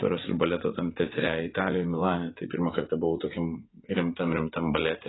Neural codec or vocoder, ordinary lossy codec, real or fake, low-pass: vocoder, 44.1 kHz, 80 mel bands, Vocos; AAC, 16 kbps; fake; 7.2 kHz